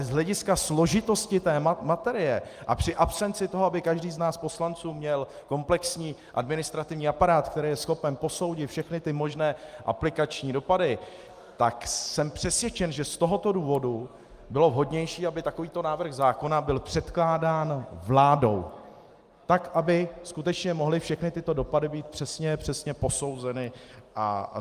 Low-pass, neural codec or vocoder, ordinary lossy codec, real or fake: 14.4 kHz; none; Opus, 32 kbps; real